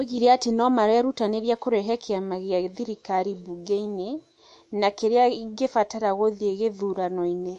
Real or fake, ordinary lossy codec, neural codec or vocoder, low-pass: fake; MP3, 48 kbps; autoencoder, 48 kHz, 128 numbers a frame, DAC-VAE, trained on Japanese speech; 14.4 kHz